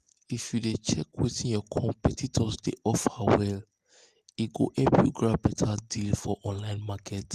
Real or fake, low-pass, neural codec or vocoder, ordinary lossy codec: real; 14.4 kHz; none; Opus, 24 kbps